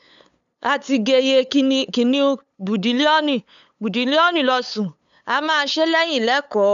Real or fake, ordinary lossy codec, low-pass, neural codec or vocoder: fake; none; 7.2 kHz; codec, 16 kHz, 8 kbps, FunCodec, trained on LibriTTS, 25 frames a second